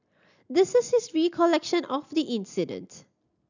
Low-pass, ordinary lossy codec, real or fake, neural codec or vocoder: 7.2 kHz; none; real; none